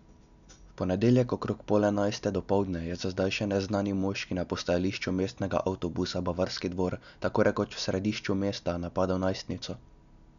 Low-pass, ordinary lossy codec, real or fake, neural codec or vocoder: 7.2 kHz; none; real; none